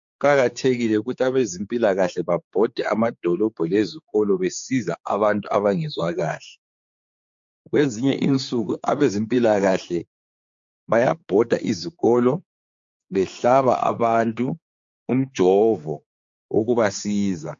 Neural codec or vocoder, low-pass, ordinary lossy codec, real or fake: codec, 16 kHz, 4 kbps, X-Codec, HuBERT features, trained on general audio; 7.2 kHz; MP3, 48 kbps; fake